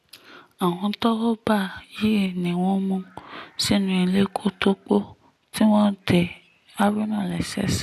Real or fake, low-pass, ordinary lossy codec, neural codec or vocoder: real; 14.4 kHz; none; none